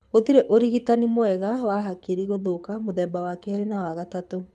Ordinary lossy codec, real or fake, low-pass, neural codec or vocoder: none; fake; none; codec, 24 kHz, 6 kbps, HILCodec